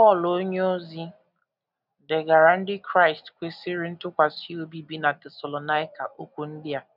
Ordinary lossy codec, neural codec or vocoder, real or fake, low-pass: none; none; real; 5.4 kHz